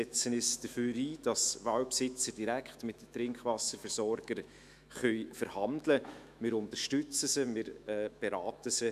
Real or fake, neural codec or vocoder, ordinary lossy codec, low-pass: fake; autoencoder, 48 kHz, 128 numbers a frame, DAC-VAE, trained on Japanese speech; none; 14.4 kHz